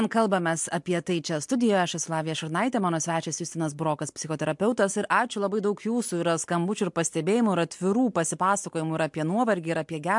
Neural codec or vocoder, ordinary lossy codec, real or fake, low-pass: none; MP3, 64 kbps; real; 10.8 kHz